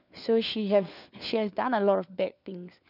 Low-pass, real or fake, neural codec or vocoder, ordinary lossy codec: 5.4 kHz; fake; codec, 16 kHz, 4 kbps, FunCodec, trained on LibriTTS, 50 frames a second; none